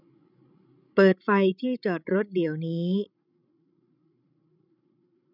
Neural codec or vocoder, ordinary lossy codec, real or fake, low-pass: codec, 16 kHz, 16 kbps, FreqCodec, larger model; none; fake; 5.4 kHz